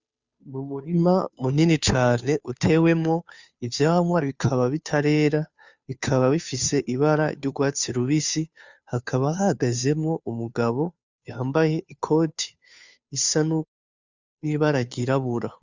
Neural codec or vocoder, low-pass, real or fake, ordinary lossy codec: codec, 16 kHz, 2 kbps, FunCodec, trained on Chinese and English, 25 frames a second; 7.2 kHz; fake; Opus, 64 kbps